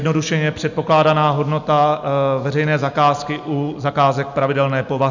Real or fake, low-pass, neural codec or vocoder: real; 7.2 kHz; none